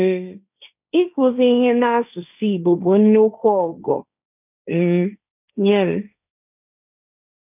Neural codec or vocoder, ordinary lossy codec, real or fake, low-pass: codec, 16 kHz, 1.1 kbps, Voila-Tokenizer; none; fake; 3.6 kHz